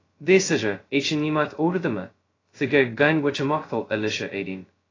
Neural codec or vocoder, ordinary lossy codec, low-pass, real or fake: codec, 16 kHz, 0.2 kbps, FocalCodec; AAC, 32 kbps; 7.2 kHz; fake